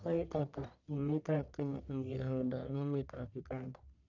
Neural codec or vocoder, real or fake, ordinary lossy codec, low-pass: codec, 44.1 kHz, 1.7 kbps, Pupu-Codec; fake; none; 7.2 kHz